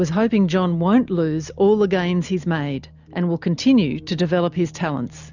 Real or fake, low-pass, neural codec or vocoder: real; 7.2 kHz; none